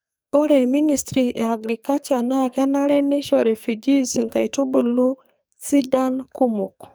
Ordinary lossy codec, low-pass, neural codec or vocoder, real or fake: none; none; codec, 44.1 kHz, 2.6 kbps, SNAC; fake